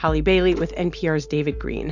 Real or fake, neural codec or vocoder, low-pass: real; none; 7.2 kHz